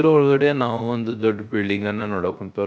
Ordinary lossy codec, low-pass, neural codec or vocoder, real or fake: none; none; codec, 16 kHz, 0.3 kbps, FocalCodec; fake